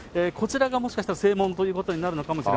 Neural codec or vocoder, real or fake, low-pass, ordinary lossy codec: none; real; none; none